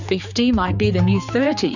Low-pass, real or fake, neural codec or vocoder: 7.2 kHz; fake; codec, 16 kHz, 4 kbps, X-Codec, HuBERT features, trained on general audio